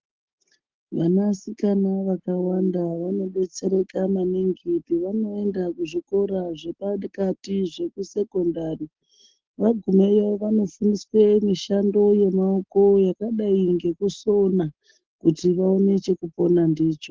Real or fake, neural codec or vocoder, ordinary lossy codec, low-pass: real; none; Opus, 16 kbps; 7.2 kHz